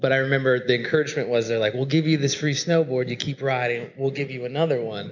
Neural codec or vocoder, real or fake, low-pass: none; real; 7.2 kHz